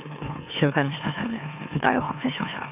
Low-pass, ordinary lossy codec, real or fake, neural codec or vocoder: 3.6 kHz; none; fake; autoencoder, 44.1 kHz, a latent of 192 numbers a frame, MeloTTS